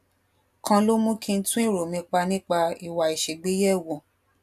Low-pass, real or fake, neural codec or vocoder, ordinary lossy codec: 14.4 kHz; real; none; AAC, 96 kbps